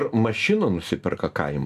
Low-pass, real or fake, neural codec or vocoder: 14.4 kHz; real; none